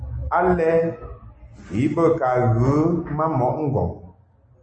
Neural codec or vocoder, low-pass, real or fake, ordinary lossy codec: none; 9.9 kHz; real; MP3, 32 kbps